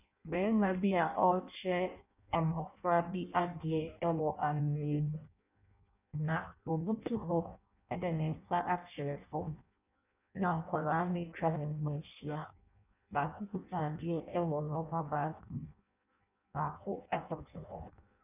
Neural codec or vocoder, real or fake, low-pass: codec, 16 kHz in and 24 kHz out, 0.6 kbps, FireRedTTS-2 codec; fake; 3.6 kHz